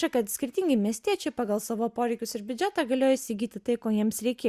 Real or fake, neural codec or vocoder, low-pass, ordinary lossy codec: real; none; 14.4 kHz; Opus, 64 kbps